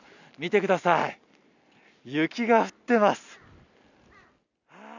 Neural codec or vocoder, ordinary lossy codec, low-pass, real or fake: none; none; 7.2 kHz; real